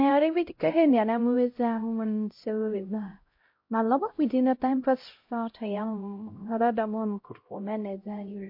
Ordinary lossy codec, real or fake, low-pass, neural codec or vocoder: MP3, 32 kbps; fake; 5.4 kHz; codec, 16 kHz, 0.5 kbps, X-Codec, HuBERT features, trained on LibriSpeech